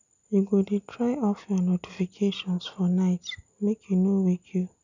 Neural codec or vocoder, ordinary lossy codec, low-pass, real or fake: none; none; 7.2 kHz; real